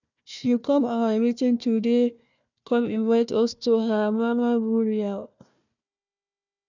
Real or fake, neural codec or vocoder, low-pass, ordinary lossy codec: fake; codec, 16 kHz, 1 kbps, FunCodec, trained on Chinese and English, 50 frames a second; 7.2 kHz; none